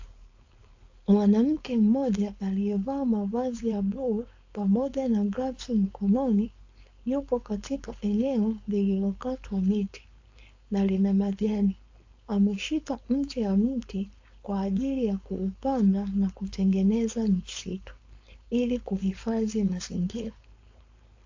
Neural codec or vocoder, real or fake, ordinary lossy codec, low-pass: codec, 16 kHz, 4.8 kbps, FACodec; fake; AAC, 48 kbps; 7.2 kHz